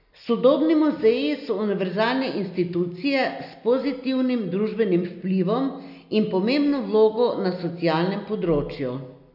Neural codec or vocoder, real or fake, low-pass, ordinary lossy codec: none; real; 5.4 kHz; none